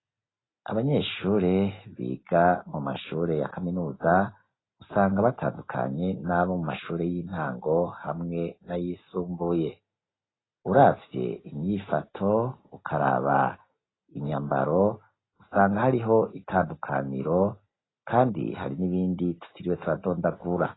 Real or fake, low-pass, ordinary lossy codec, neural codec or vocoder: real; 7.2 kHz; AAC, 16 kbps; none